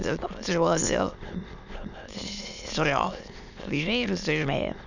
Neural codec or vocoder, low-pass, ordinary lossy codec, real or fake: autoencoder, 22.05 kHz, a latent of 192 numbers a frame, VITS, trained on many speakers; 7.2 kHz; none; fake